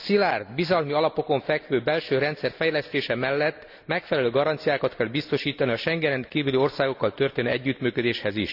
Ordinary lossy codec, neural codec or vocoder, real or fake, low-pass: none; none; real; 5.4 kHz